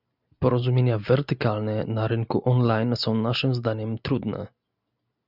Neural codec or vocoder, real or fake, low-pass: none; real; 5.4 kHz